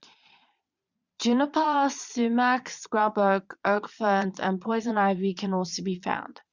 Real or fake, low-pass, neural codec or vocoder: fake; 7.2 kHz; vocoder, 22.05 kHz, 80 mel bands, Vocos